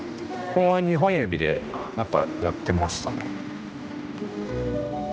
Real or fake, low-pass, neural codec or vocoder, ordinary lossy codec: fake; none; codec, 16 kHz, 1 kbps, X-Codec, HuBERT features, trained on general audio; none